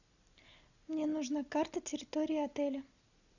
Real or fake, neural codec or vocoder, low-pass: real; none; 7.2 kHz